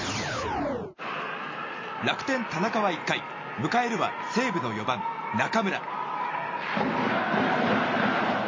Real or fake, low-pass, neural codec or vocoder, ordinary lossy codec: real; 7.2 kHz; none; MP3, 32 kbps